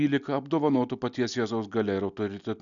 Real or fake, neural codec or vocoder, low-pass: real; none; 7.2 kHz